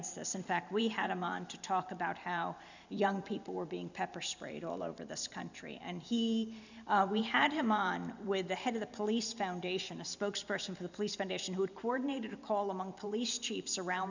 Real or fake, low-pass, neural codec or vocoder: fake; 7.2 kHz; vocoder, 44.1 kHz, 128 mel bands every 512 samples, BigVGAN v2